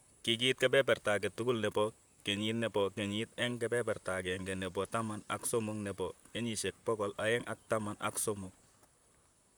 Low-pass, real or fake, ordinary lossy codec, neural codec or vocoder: none; fake; none; vocoder, 44.1 kHz, 128 mel bands, Pupu-Vocoder